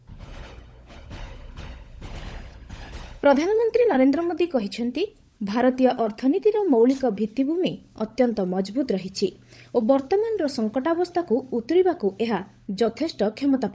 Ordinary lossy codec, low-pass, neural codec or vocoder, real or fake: none; none; codec, 16 kHz, 16 kbps, FunCodec, trained on LibriTTS, 50 frames a second; fake